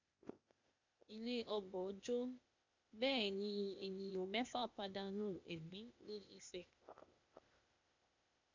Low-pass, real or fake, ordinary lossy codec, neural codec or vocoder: 7.2 kHz; fake; MP3, 64 kbps; codec, 16 kHz, 0.8 kbps, ZipCodec